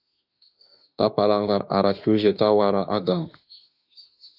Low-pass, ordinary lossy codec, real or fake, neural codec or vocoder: 5.4 kHz; AAC, 48 kbps; fake; autoencoder, 48 kHz, 32 numbers a frame, DAC-VAE, trained on Japanese speech